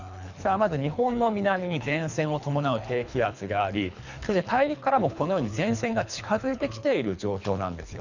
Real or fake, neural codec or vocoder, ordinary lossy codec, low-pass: fake; codec, 24 kHz, 3 kbps, HILCodec; none; 7.2 kHz